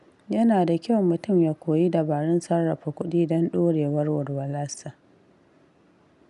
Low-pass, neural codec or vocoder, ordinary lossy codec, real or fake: 10.8 kHz; none; none; real